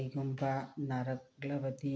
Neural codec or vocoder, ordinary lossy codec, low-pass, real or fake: none; none; none; real